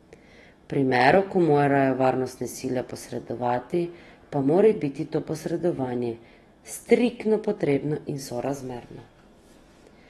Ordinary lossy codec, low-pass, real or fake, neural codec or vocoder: AAC, 32 kbps; 19.8 kHz; fake; autoencoder, 48 kHz, 128 numbers a frame, DAC-VAE, trained on Japanese speech